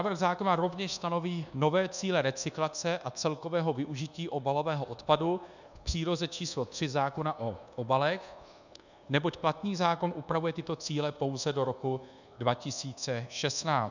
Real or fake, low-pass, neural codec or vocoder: fake; 7.2 kHz; codec, 24 kHz, 1.2 kbps, DualCodec